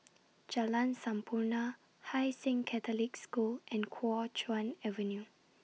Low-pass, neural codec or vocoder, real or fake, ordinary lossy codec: none; none; real; none